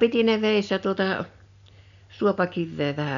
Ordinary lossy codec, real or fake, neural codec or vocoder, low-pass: none; real; none; 7.2 kHz